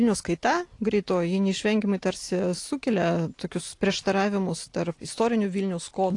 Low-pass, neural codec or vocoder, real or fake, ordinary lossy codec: 10.8 kHz; none; real; AAC, 48 kbps